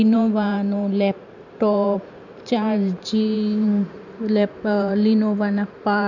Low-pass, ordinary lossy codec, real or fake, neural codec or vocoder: 7.2 kHz; none; fake; vocoder, 44.1 kHz, 128 mel bands every 512 samples, BigVGAN v2